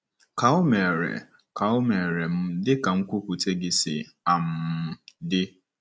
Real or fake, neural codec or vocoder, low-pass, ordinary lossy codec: real; none; none; none